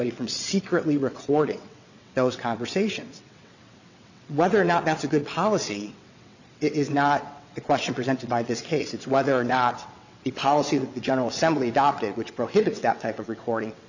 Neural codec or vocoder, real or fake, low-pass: vocoder, 22.05 kHz, 80 mel bands, WaveNeXt; fake; 7.2 kHz